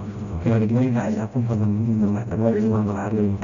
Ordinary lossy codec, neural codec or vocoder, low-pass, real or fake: none; codec, 16 kHz, 0.5 kbps, FreqCodec, smaller model; 7.2 kHz; fake